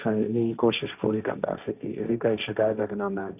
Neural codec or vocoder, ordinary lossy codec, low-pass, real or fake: codec, 16 kHz, 1.1 kbps, Voila-Tokenizer; none; 3.6 kHz; fake